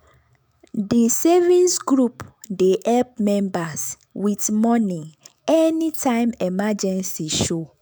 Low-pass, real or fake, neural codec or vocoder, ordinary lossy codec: none; fake; autoencoder, 48 kHz, 128 numbers a frame, DAC-VAE, trained on Japanese speech; none